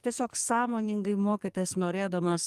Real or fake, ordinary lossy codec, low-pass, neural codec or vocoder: fake; Opus, 32 kbps; 14.4 kHz; codec, 44.1 kHz, 2.6 kbps, SNAC